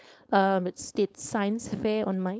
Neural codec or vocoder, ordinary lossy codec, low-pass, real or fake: codec, 16 kHz, 4.8 kbps, FACodec; none; none; fake